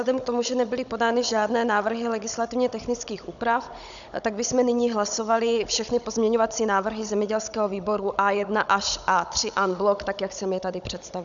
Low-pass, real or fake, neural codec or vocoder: 7.2 kHz; fake; codec, 16 kHz, 16 kbps, FunCodec, trained on Chinese and English, 50 frames a second